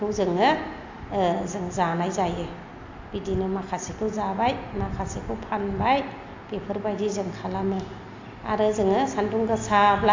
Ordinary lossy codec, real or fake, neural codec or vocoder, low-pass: AAC, 48 kbps; real; none; 7.2 kHz